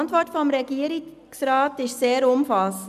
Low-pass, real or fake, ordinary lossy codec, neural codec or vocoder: 14.4 kHz; real; none; none